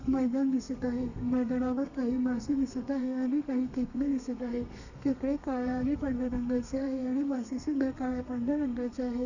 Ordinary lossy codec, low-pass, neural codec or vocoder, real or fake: none; 7.2 kHz; codec, 32 kHz, 1.9 kbps, SNAC; fake